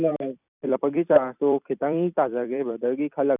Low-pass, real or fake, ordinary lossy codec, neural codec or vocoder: 3.6 kHz; fake; none; vocoder, 44.1 kHz, 128 mel bands every 256 samples, BigVGAN v2